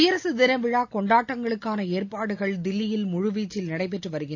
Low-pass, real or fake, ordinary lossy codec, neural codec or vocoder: 7.2 kHz; real; AAC, 48 kbps; none